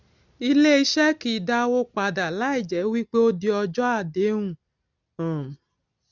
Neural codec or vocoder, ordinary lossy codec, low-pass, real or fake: none; none; 7.2 kHz; real